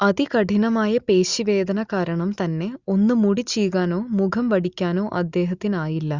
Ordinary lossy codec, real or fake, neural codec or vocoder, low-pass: Opus, 64 kbps; real; none; 7.2 kHz